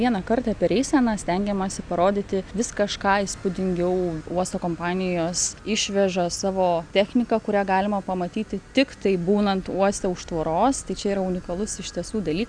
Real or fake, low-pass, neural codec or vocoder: real; 9.9 kHz; none